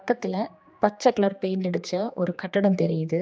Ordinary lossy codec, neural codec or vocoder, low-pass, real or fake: none; codec, 16 kHz, 2 kbps, X-Codec, HuBERT features, trained on general audio; none; fake